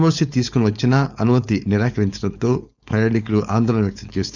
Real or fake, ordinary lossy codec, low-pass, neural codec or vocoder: fake; none; 7.2 kHz; codec, 16 kHz, 4.8 kbps, FACodec